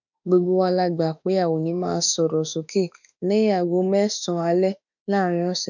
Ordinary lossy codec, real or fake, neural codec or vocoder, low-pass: none; fake; autoencoder, 48 kHz, 32 numbers a frame, DAC-VAE, trained on Japanese speech; 7.2 kHz